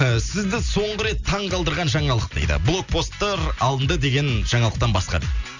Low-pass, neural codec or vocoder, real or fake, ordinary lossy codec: 7.2 kHz; none; real; none